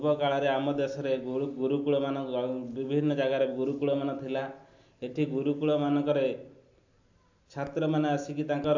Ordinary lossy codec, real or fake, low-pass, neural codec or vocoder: AAC, 48 kbps; real; 7.2 kHz; none